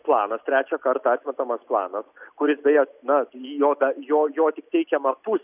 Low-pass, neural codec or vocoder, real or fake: 3.6 kHz; none; real